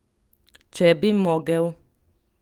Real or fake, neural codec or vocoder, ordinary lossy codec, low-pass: fake; codec, 44.1 kHz, 7.8 kbps, DAC; Opus, 32 kbps; 19.8 kHz